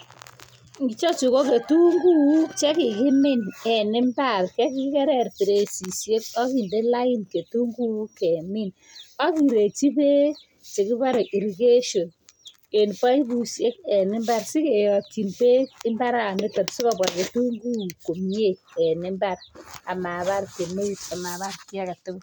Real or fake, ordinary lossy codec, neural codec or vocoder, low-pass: real; none; none; none